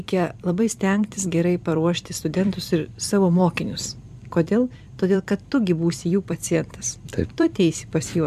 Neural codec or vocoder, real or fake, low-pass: vocoder, 44.1 kHz, 128 mel bands every 256 samples, BigVGAN v2; fake; 14.4 kHz